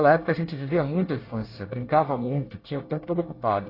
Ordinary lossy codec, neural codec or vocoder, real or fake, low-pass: AAC, 24 kbps; codec, 24 kHz, 1 kbps, SNAC; fake; 5.4 kHz